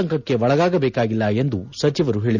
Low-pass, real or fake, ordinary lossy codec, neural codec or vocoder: 7.2 kHz; real; none; none